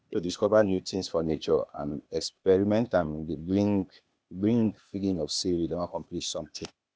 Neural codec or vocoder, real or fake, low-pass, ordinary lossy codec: codec, 16 kHz, 0.8 kbps, ZipCodec; fake; none; none